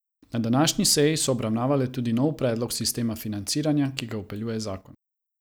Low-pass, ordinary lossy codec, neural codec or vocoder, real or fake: none; none; none; real